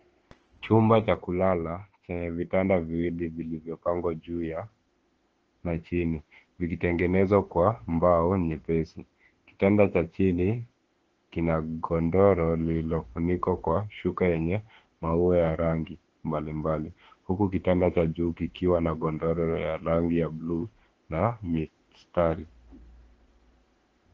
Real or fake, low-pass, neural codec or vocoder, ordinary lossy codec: fake; 7.2 kHz; autoencoder, 48 kHz, 32 numbers a frame, DAC-VAE, trained on Japanese speech; Opus, 16 kbps